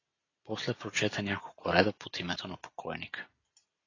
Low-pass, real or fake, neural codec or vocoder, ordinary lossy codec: 7.2 kHz; real; none; AAC, 32 kbps